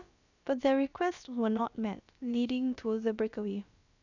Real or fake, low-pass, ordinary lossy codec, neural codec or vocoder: fake; 7.2 kHz; none; codec, 16 kHz, about 1 kbps, DyCAST, with the encoder's durations